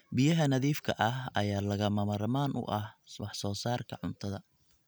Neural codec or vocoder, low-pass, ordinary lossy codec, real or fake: none; none; none; real